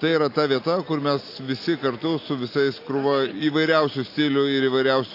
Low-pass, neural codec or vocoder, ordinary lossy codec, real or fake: 5.4 kHz; none; AAC, 32 kbps; real